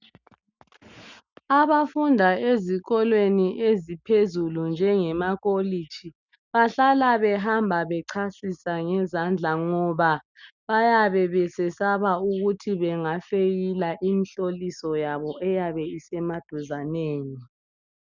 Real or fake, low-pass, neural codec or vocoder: real; 7.2 kHz; none